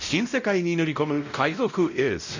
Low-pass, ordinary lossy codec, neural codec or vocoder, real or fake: 7.2 kHz; none; codec, 16 kHz, 0.5 kbps, X-Codec, WavLM features, trained on Multilingual LibriSpeech; fake